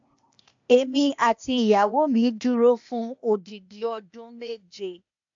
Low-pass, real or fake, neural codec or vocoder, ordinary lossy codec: 7.2 kHz; fake; codec, 16 kHz, 0.8 kbps, ZipCodec; MP3, 64 kbps